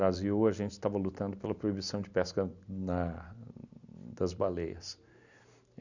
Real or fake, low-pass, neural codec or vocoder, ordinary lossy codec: real; 7.2 kHz; none; none